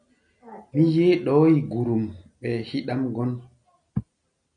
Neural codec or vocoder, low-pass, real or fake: none; 9.9 kHz; real